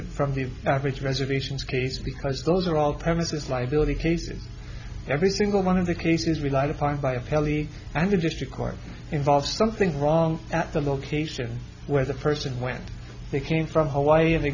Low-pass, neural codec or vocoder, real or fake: 7.2 kHz; none; real